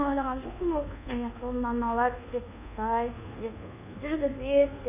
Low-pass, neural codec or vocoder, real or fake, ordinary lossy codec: 3.6 kHz; codec, 24 kHz, 1.2 kbps, DualCodec; fake; none